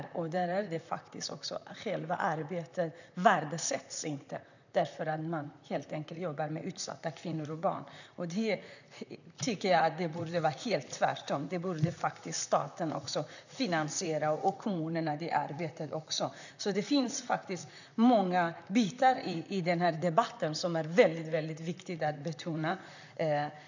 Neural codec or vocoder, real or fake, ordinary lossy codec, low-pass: vocoder, 44.1 kHz, 128 mel bands, Pupu-Vocoder; fake; none; 7.2 kHz